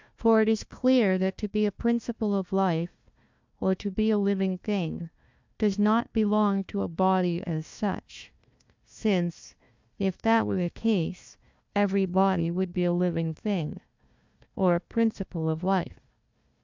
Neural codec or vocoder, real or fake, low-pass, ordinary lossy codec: codec, 16 kHz, 1 kbps, FunCodec, trained on Chinese and English, 50 frames a second; fake; 7.2 kHz; MP3, 64 kbps